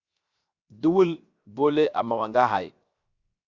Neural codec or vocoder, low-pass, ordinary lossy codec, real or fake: codec, 16 kHz, 0.7 kbps, FocalCodec; 7.2 kHz; Opus, 64 kbps; fake